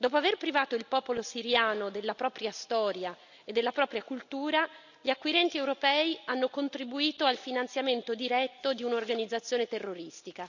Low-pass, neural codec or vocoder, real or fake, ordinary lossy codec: 7.2 kHz; none; real; none